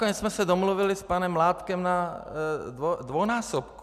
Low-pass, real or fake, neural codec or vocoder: 14.4 kHz; real; none